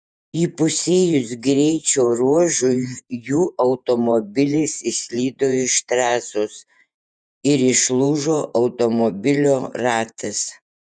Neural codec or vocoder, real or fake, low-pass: vocoder, 48 kHz, 128 mel bands, Vocos; fake; 9.9 kHz